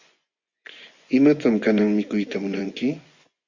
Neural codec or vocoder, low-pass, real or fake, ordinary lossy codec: vocoder, 24 kHz, 100 mel bands, Vocos; 7.2 kHz; fake; Opus, 64 kbps